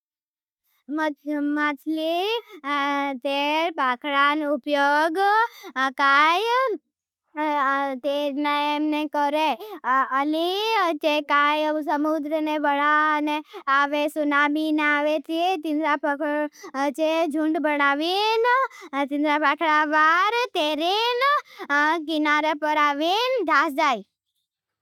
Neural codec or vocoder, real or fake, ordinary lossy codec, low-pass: none; real; none; 19.8 kHz